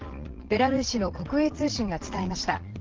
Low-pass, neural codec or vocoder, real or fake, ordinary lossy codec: 7.2 kHz; vocoder, 22.05 kHz, 80 mel bands, Vocos; fake; Opus, 16 kbps